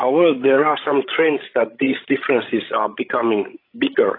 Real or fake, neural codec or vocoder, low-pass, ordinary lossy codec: fake; codec, 16 kHz, 16 kbps, FreqCodec, larger model; 5.4 kHz; AAC, 24 kbps